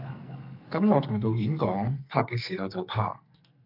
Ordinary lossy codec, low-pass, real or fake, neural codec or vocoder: AAC, 48 kbps; 5.4 kHz; fake; codec, 44.1 kHz, 2.6 kbps, SNAC